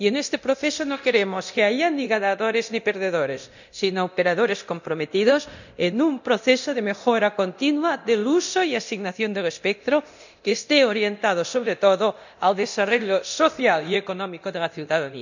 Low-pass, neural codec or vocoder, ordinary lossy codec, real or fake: 7.2 kHz; codec, 24 kHz, 0.9 kbps, DualCodec; none; fake